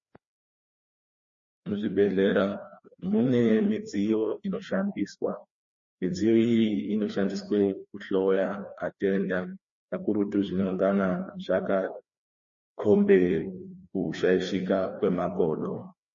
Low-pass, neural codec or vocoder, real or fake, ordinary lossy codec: 7.2 kHz; codec, 16 kHz, 2 kbps, FreqCodec, larger model; fake; MP3, 32 kbps